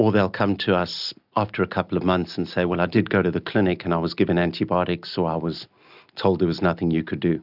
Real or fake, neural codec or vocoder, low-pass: real; none; 5.4 kHz